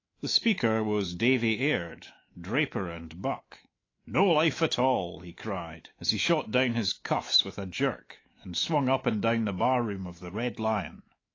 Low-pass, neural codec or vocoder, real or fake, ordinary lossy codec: 7.2 kHz; autoencoder, 48 kHz, 128 numbers a frame, DAC-VAE, trained on Japanese speech; fake; AAC, 32 kbps